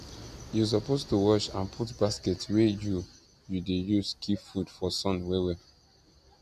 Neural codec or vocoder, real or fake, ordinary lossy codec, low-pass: none; real; none; 14.4 kHz